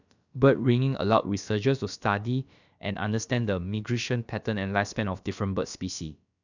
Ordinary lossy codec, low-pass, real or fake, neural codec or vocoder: none; 7.2 kHz; fake; codec, 16 kHz, about 1 kbps, DyCAST, with the encoder's durations